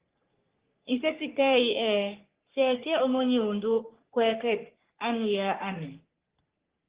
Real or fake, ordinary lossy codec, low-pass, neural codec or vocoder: fake; Opus, 16 kbps; 3.6 kHz; codec, 44.1 kHz, 3.4 kbps, Pupu-Codec